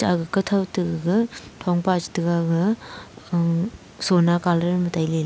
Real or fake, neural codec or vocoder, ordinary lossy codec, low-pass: real; none; none; none